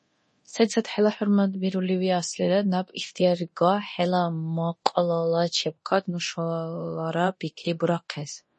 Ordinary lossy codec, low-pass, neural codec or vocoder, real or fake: MP3, 32 kbps; 10.8 kHz; codec, 24 kHz, 0.9 kbps, DualCodec; fake